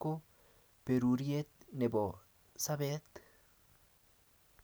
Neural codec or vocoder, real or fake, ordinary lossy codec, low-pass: none; real; none; none